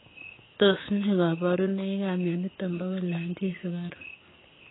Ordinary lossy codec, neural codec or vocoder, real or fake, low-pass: AAC, 16 kbps; codec, 16 kHz, 6 kbps, DAC; fake; 7.2 kHz